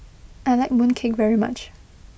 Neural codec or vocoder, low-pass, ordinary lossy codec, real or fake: none; none; none; real